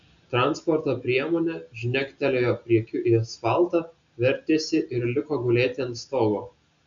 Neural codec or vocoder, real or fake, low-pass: none; real; 7.2 kHz